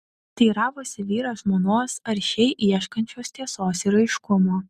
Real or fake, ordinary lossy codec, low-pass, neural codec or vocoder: real; Opus, 64 kbps; 14.4 kHz; none